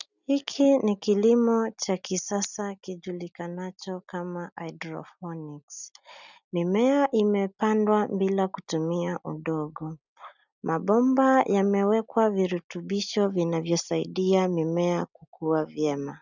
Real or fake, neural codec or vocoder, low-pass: real; none; 7.2 kHz